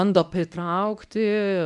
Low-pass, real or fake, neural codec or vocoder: 10.8 kHz; fake; codec, 24 kHz, 0.9 kbps, WavTokenizer, medium speech release version 1